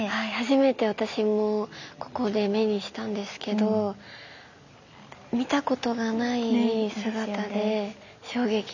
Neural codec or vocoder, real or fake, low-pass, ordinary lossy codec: none; real; 7.2 kHz; none